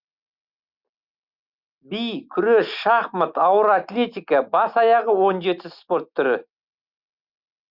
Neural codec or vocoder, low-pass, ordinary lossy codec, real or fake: none; 5.4 kHz; Opus, 64 kbps; real